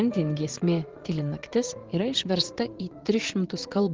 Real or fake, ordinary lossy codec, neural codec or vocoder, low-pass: fake; Opus, 16 kbps; vocoder, 22.05 kHz, 80 mel bands, Vocos; 7.2 kHz